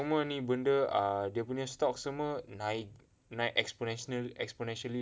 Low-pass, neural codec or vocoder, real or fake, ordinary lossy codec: none; none; real; none